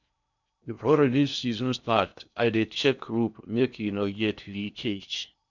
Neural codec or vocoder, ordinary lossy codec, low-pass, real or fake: codec, 16 kHz in and 24 kHz out, 0.8 kbps, FocalCodec, streaming, 65536 codes; none; 7.2 kHz; fake